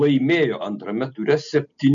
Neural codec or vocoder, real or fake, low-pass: none; real; 7.2 kHz